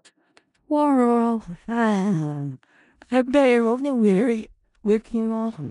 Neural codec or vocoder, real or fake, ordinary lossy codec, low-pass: codec, 16 kHz in and 24 kHz out, 0.4 kbps, LongCat-Audio-Codec, four codebook decoder; fake; none; 10.8 kHz